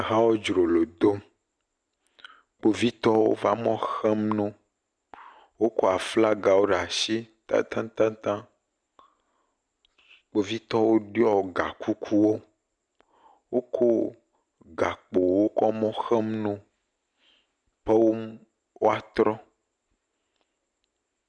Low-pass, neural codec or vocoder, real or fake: 9.9 kHz; none; real